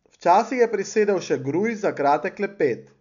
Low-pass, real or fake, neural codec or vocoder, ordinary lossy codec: 7.2 kHz; real; none; none